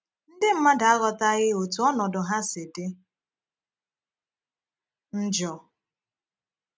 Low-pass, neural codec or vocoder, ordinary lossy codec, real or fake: none; none; none; real